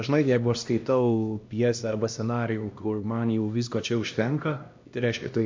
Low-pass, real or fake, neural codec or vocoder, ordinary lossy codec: 7.2 kHz; fake; codec, 16 kHz, 1 kbps, X-Codec, HuBERT features, trained on LibriSpeech; MP3, 48 kbps